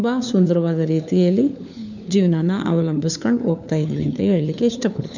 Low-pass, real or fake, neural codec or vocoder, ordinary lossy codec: 7.2 kHz; fake; codec, 16 kHz, 4 kbps, FunCodec, trained on LibriTTS, 50 frames a second; none